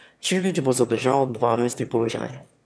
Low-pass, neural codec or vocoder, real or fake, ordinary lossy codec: none; autoencoder, 22.05 kHz, a latent of 192 numbers a frame, VITS, trained on one speaker; fake; none